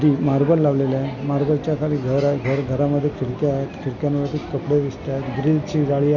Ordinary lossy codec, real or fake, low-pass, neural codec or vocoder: none; real; 7.2 kHz; none